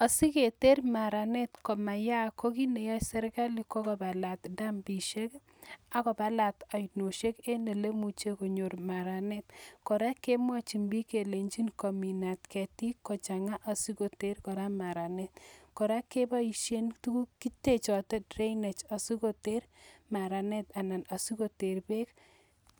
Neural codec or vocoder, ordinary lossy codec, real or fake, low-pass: none; none; real; none